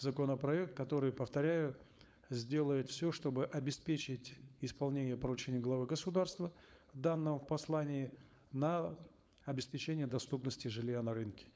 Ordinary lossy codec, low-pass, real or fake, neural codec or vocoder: none; none; fake; codec, 16 kHz, 4.8 kbps, FACodec